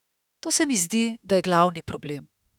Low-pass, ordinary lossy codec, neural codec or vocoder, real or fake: 19.8 kHz; none; autoencoder, 48 kHz, 32 numbers a frame, DAC-VAE, trained on Japanese speech; fake